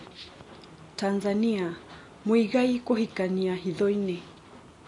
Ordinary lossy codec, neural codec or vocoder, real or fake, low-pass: MP3, 48 kbps; none; real; 10.8 kHz